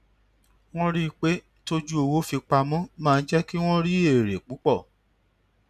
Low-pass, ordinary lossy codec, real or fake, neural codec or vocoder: 14.4 kHz; none; real; none